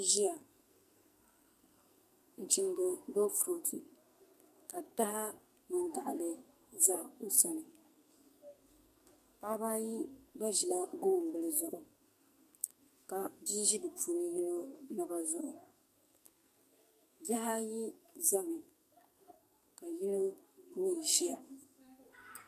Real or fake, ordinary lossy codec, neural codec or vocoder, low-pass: fake; MP3, 96 kbps; codec, 44.1 kHz, 2.6 kbps, SNAC; 14.4 kHz